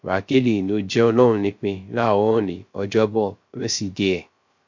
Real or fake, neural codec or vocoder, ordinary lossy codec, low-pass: fake; codec, 16 kHz, 0.3 kbps, FocalCodec; MP3, 48 kbps; 7.2 kHz